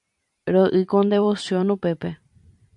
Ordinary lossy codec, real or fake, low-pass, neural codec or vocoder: MP3, 64 kbps; fake; 10.8 kHz; vocoder, 44.1 kHz, 128 mel bands every 512 samples, BigVGAN v2